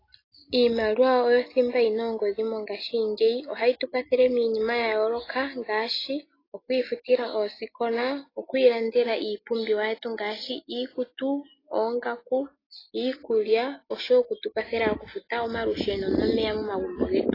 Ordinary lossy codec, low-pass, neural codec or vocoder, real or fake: AAC, 24 kbps; 5.4 kHz; none; real